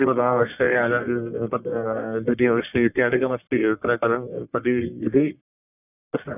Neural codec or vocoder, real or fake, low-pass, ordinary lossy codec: codec, 44.1 kHz, 1.7 kbps, Pupu-Codec; fake; 3.6 kHz; none